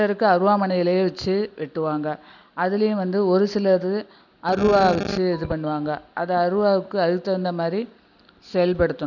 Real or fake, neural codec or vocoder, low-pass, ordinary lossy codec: real; none; 7.2 kHz; none